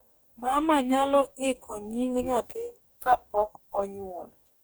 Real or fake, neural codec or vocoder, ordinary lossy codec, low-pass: fake; codec, 44.1 kHz, 2.6 kbps, DAC; none; none